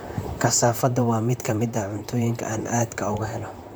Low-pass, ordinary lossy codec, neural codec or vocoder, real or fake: none; none; vocoder, 44.1 kHz, 128 mel bands, Pupu-Vocoder; fake